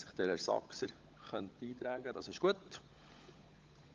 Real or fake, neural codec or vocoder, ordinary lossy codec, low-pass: fake; codec, 16 kHz, 16 kbps, FunCodec, trained on LibriTTS, 50 frames a second; Opus, 32 kbps; 7.2 kHz